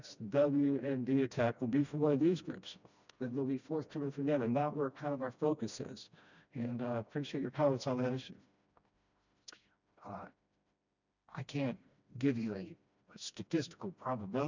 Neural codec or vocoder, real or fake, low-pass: codec, 16 kHz, 1 kbps, FreqCodec, smaller model; fake; 7.2 kHz